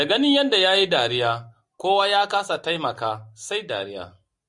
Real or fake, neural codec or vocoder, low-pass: real; none; 10.8 kHz